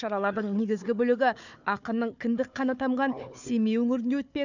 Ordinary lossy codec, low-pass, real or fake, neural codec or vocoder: none; 7.2 kHz; fake; codec, 16 kHz, 4 kbps, FunCodec, trained on Chinese and English, 50 frames a second